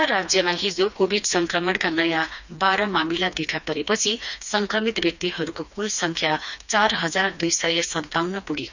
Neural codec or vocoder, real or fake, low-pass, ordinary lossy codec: codec, 16 kHz, 2 kbps, FreqCodec, smaller model; fake; 7.2 kHz; none